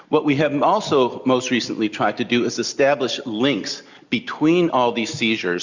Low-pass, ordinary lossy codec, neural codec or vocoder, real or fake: 7.2 kHz; Opus, 64 kbps; none; real